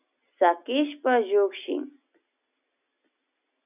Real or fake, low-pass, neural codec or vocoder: real; 3.6 kHz; none